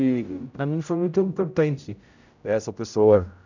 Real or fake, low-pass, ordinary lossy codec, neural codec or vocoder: fake; 7.2 kHz; none; codec, 16 kHz, 0.5 kbps, X-Codec, HuBERT features, trained on general audio